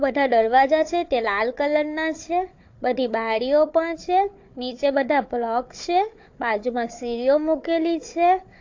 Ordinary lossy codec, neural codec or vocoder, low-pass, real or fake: AAC, 48 kbps; codec, 16 kHz, 4 kbps, FunCodec, trained on Chinese and English, 50 frames a second; 7.2 kHz; fake